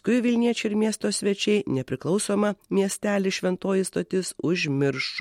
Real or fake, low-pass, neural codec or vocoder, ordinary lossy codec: real; 19.8 kHz; none; MP3, 64 kbps